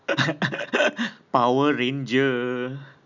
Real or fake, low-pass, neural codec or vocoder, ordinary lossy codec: real; 7.2 kHz; none; none